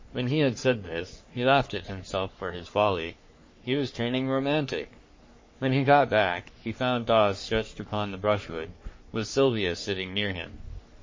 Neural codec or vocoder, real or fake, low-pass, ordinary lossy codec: codec, 44.1 kHz, 3.4 kbps, Pupu-Codec; fake; 7.2 kHz; MP3, 32 kbps